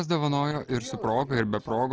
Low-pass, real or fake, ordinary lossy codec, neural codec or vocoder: 7.2 kHz; real; Opus, 16 kbps; none